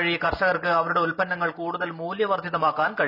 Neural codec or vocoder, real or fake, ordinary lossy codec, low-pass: none; real; none; 5.4 kHz